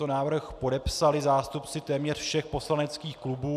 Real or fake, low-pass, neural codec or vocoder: real; 14.4 kHz; none